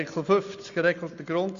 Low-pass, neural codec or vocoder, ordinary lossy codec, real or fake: 7.2 kHz; none; Opus, 64 kbps; real